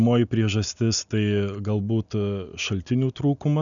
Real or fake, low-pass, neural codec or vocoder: real; 7.2 kHz; none